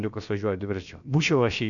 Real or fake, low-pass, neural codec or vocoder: fake; 7.2 kHz; codec, 16 kHz, about 1 kbps, DyCAST, with the encoder's durations